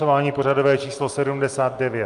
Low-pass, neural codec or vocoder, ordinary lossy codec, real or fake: 10.8 kHz; none; Opus, 32 kbps; real